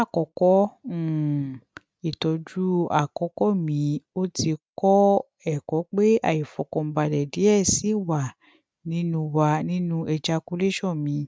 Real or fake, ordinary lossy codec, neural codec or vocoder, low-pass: real; none; none; none